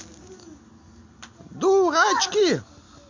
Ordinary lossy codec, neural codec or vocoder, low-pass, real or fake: MP3, 48 kbps; none; 7.2 kHz; real